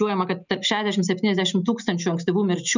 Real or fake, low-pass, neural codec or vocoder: real; 7.2 kHz; none